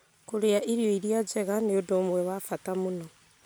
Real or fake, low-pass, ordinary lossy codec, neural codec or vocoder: real; none; none; none